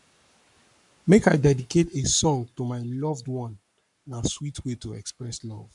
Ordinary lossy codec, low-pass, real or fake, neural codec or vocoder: none; 10.8 kHz; fake; codec, 44.1 kHz, 7.8 kbps, Pupu-Codec